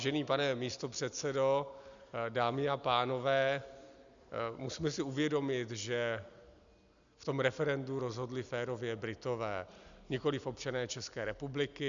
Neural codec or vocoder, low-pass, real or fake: none; 7.2 kHz; real